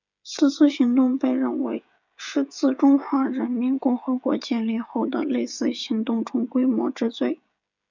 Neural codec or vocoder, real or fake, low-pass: codec, 16 kHz, 16 kbps, FreqCodec, smaller model; fake; 7.2 kHz